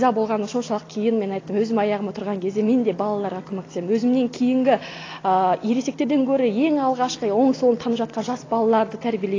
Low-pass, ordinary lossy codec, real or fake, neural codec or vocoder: 7.2 kHz; AAC, 32 kbps; real; none